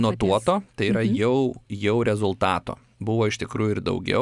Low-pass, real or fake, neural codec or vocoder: 10.8 kHz; real; none